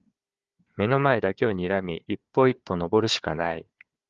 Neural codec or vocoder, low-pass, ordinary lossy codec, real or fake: codec, 16 kHz, 4 kbps, FunCodec, trained on Chinese and English, 50 frames a second; 7.2 kHz; Opus, 32 kbps; fake